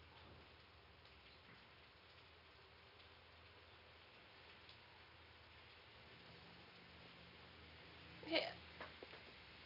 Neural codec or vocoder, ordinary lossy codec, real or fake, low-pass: none; none; real; 5.4 kHz